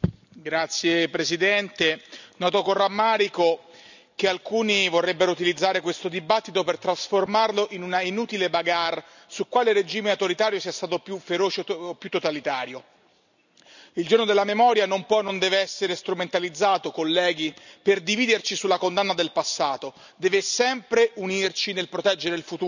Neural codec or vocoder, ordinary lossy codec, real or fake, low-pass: none; none; real; 7.2 kHz